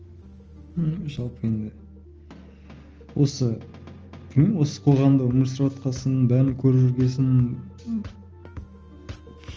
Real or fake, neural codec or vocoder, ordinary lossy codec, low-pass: real; none; Opus, 24 kbps; 7.2 kHz